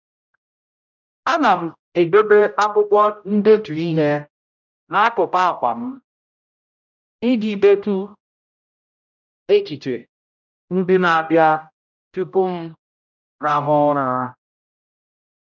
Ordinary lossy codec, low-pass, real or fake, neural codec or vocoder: none; 7.2 kHz; fake; codec, 16 kHz, 0.5 kbps, X-Codec, HuBERT features, trained on general audio